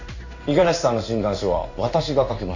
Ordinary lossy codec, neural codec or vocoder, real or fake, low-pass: none; codec, 16 kHz in and 24 kHz out, 1 kbps, XY-Tokenizer; fake; 7.2 kHz